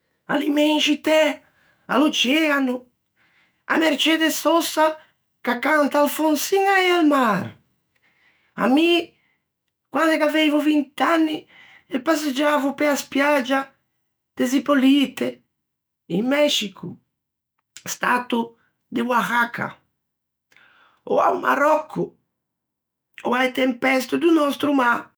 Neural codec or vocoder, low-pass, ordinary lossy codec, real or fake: autoencoder, 48 kHz, 128 numbers a frame, DAC-VAE, trained on Japanese speech; none; none; fake